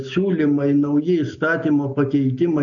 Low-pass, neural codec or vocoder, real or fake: 7.2 kHz; none; real